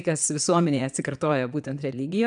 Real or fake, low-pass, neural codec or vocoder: fake; 9.9 kHz; vocoder, 22.05 kHz, 80 mel bands, Vocos